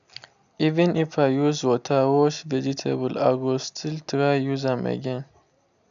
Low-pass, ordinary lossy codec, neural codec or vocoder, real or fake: 7.2 kHz; none; none; real